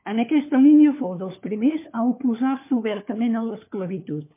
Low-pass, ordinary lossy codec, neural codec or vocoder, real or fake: 3.6 kHz; MP3, 24 kbps; codec, 16 kHz, 4 kbps, FunCodec, trained on LibriTTS, 50 frames a second; fake